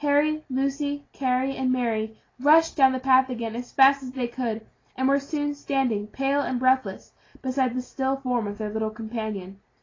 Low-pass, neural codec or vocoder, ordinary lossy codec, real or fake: 7.2 kHz; none; AAC, 32 kbps; real